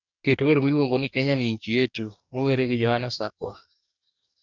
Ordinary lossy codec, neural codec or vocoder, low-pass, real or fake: none; codec, 44.1 kHz, 2.6 kbps, DAC; 7.2 kHz; fake